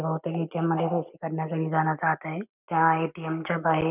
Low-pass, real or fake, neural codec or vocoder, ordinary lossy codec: 3.6 kHz; real; none; none